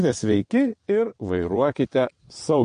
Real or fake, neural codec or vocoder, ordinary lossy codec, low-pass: fake; vocoder, 22.05 kHz, 80 mel bands, WaveNeXt; MP3, 48 kbps; 9.9 kHz